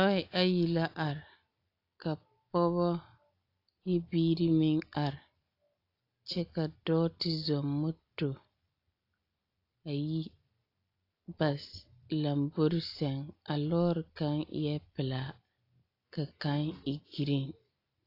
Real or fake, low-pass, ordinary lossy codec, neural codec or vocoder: real; 5.4 kHz; AAC, 32 kbps; none